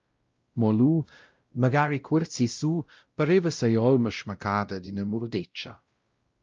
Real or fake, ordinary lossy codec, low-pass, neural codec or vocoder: fake; Opus, 24 kbps; 7.2 kHz; codec, 16 kHz, 0.5 kbps, X-Codec, WavLM features, trained on Multilingual LibriSpeech